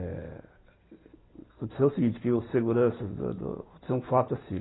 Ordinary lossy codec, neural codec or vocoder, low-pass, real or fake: AAC, 16 kbps; none; 7.2 kHz; real